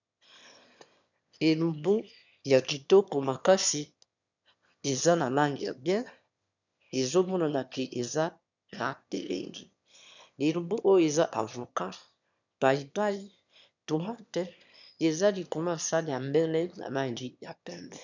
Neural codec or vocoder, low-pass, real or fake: autoencoder, 22.05 kHz, a latent of 192 numbers a frame, VITS, trained on one speaker; 7.2 kHz; fake